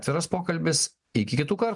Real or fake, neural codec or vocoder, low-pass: real; none; 10.8 kHz